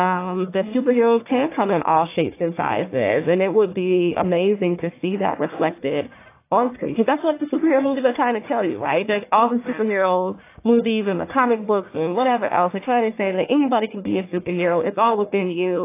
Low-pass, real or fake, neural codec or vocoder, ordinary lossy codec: 3.6 kHz; fake; codec, 44.1 kHz, 1.7 kbps, Pupu-Codec; AAC, 24 kbps